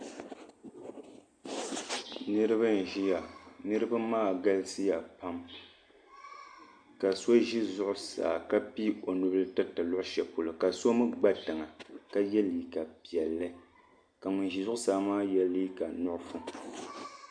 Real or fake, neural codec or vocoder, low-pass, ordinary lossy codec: real; none; 9.9 kHz; MP3, 64 kbps